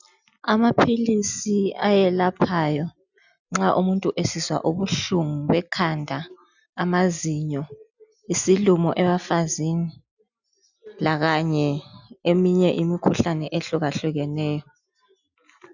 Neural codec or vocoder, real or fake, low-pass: none; real; 7.2 kHz